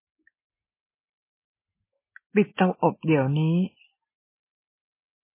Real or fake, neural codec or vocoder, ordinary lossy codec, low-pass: real; none; MP3, 16 kbps; 3.6 kHz